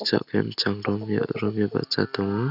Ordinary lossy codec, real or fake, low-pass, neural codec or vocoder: none; real; 5.4 kHz; none